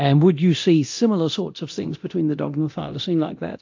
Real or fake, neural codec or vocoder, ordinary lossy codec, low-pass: fake; codec, 24 kHz, 0.9 kbps, DualCodec; AAC, 48 kbps; 7.2 kHz